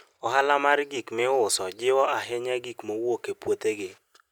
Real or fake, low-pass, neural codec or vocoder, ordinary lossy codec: real; none; none; none